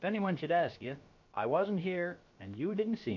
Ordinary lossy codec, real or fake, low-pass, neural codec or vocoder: MP3, 48 kbps; fake; 7.2 kHz; codec, 16 kHz, about 1 kbps, DyCAST, with the encoder's durations